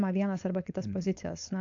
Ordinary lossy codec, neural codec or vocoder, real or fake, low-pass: AAC, 64 kbps; codec, 16 kHz, 4.8 kbps, FACodec; fake; 7.2 kHz